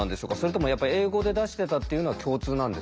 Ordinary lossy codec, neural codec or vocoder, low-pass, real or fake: none; none; none; real